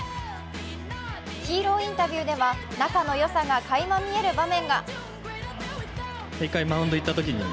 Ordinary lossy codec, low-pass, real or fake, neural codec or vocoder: none; none; real; none